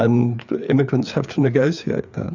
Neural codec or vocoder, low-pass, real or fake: codec, 16 kHz, 4 kbps, FreqCodec, larger model; 7.2 kHz; fake